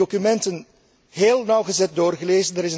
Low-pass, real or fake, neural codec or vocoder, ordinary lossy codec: none; real; none; none